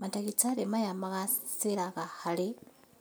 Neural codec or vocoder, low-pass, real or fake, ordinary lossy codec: none; none; real; none